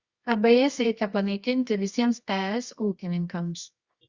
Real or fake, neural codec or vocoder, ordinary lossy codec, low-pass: fake; codec, 24 kHz, 0.9 kbps, WavTokenizer, medium music audio release; Opus, 64 kbps; 7.2 kHz